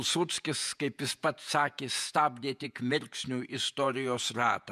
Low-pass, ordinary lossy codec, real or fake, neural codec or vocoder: 14.4 kHz; MP3, 96 kbps; fake; vocoder, 48 kHz, 128 mel bands, Vocos